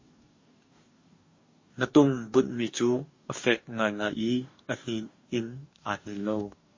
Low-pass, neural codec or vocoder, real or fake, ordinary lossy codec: 7.2 kHz; codec, 44.1 kHz, 2.6 kbps, DAC; fake; MP3, 32 kbps